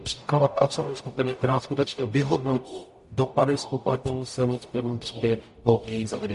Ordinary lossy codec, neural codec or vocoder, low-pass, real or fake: MP3, 48 kbps; codec, 44.1 kHz, 0.9 kbps, DAC; 14.4 kHz; fake